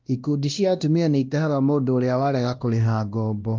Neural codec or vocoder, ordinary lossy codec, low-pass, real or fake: codec, 16 kHz, 1 kbps, X-Codec, WavLM features, trained on Multilingual LibriSpeech; Opus, 32 kbps; 7.2 kHz; fake